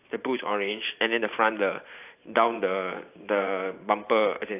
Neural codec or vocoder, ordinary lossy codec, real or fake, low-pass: vocoder, 44.1 kHz, 128 mel bands every 512 samples, BigVGAN v2; AAC, 24 kbps; fake; 3.6 kHz